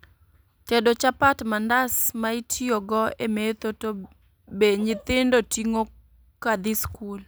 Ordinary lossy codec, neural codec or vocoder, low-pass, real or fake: none; none; none; real